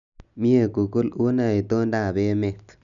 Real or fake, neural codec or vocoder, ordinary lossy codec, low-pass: real; none; none; 7.2 kHz